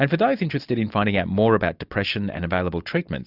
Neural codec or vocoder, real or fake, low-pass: none; real; 5.4 kHz